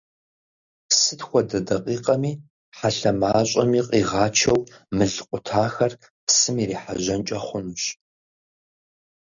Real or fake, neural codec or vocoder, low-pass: real; none; 7.2 kHz